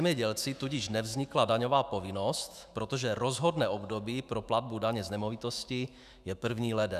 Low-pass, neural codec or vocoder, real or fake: 14.4 kHz; autoencoder, 48 kHz, 128 numbers a frame, DAC-VAE, trained on Japanese speech; fake